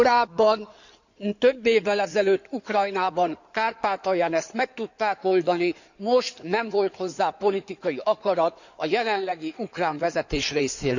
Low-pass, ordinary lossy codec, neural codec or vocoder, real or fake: 7.2 kHz; none; codec, 16 kHz in and 24 kHz out, 2.2 kbps, FireRedTTS-2 codec; fake